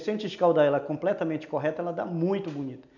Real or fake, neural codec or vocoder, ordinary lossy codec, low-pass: real; none; none; 7.2 kHz